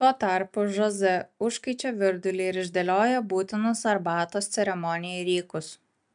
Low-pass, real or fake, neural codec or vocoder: 9.9 kHz; real; none